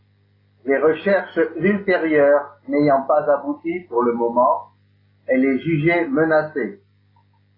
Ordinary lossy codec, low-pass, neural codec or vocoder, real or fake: AAC, 24 kbps; 5.4 kHz; none; real